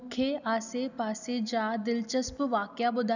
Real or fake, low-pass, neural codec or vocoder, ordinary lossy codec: real; 7.2 kHz; none; none